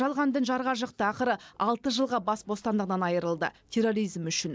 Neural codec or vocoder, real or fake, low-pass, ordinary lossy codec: none; real; none; none